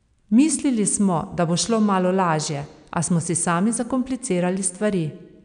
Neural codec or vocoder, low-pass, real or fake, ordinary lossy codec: none; 9.9 kHz; real; none